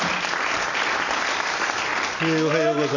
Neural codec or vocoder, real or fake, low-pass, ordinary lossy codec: none; real; 7.2 kHz; none